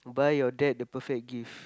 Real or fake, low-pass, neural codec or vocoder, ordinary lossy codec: real; none; none; none